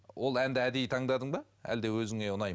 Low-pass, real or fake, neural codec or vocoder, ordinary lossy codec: none; real; none; none